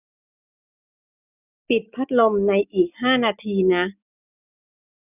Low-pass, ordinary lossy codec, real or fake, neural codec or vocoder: 3.6 kHz; none; real; none